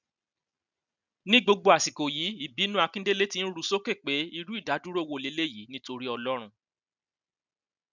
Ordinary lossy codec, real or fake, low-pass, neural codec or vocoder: none; real; 7.2 kHz; none